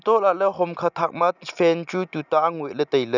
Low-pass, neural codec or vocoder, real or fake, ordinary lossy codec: 7.2 kHz; none; real; none